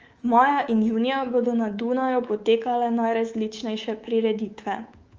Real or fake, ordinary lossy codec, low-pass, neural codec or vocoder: fake; Opus, 24 kbps; 7.2 kHz; codec, 24 kHz, 3.1 kbps, DualCodec